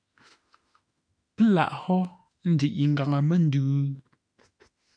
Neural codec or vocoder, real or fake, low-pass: autoencoder, 48 kHz, 32 numbers a frame, DAC-VAE, trained on Japanese speech; fake; 9.9 kHz